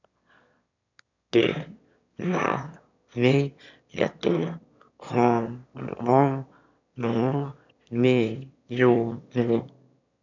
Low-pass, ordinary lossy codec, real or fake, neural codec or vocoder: 7.2 kHz; none; fake; autoencoder, 22.05 kHz, a latent of 192 numbers a frame, VITS, trained on one speaker